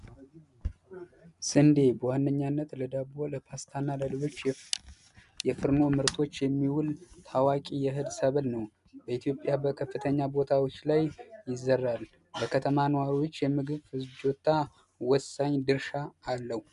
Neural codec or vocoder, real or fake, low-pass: none; real; 10.8 kHz